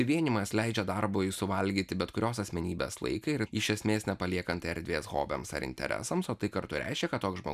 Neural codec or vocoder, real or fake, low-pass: none; real; 14.4 kHz